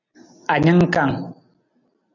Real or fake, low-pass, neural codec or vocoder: real; 7.2 kHz; none